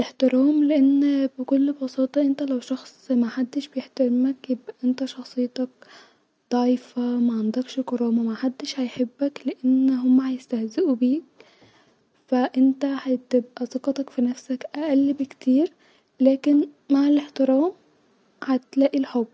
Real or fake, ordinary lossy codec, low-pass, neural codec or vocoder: real; none; none; none